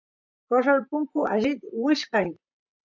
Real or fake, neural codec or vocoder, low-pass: fake; vocoder, 44.1 kHz, 128 mel bands, Pupu-Vocoder; 7.2 kHz